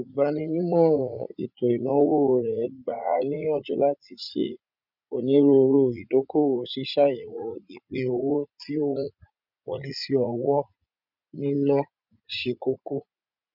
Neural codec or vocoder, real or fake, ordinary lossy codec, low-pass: vocoder, 44.1 kHz, 80 mel bands, Vocos; fake; none; 5.4 kHz